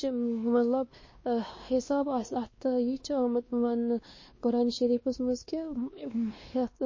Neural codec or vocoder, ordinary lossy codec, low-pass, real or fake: codec, 16 kHz, 2 kbps, X-Codec, WavLM features, trained on Multilingual LibriSpeech; MP3, 32 kbps; 7.2 kHz; fake